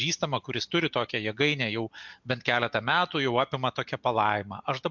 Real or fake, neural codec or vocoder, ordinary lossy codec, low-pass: real; none; MP3, 64 kbps; 7.2 kHz